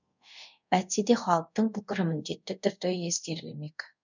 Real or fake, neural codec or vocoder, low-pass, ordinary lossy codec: fake; codec, 24 kHz, 0.5 kbps, DualCodec; 7.2 kHz; none